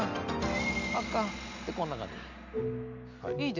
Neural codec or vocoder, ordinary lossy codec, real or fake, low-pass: none; none; real; 7.2 kHz